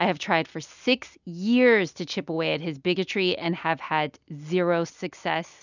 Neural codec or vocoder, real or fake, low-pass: none; real; 7.2 kHz